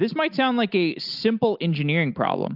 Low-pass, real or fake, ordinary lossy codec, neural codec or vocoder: 5.4 kHz; real; Opus, 24 kbps; none